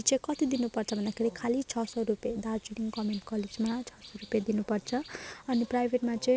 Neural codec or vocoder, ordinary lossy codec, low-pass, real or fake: none; none; none; real